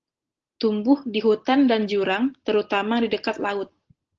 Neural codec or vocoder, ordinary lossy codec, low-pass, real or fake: none; Opus, 16 kbps; 7.2 kHz; real